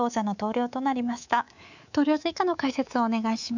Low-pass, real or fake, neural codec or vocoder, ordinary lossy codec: 7.2 kHz; fake; codec, 24 kHz, 3.1 kbps, DualCodec; none